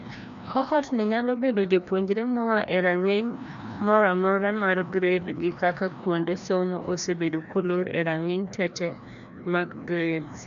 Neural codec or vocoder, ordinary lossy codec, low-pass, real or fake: codec, 16 kHz, 1 kbps, FreqCodec, larger model; none; 7.2 kHz; fake